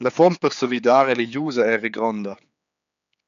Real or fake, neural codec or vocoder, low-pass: fake; codec, 16 kHz, 4 kbps, X-Codec, HuBERT features, trained on general audio; 7.2 kHz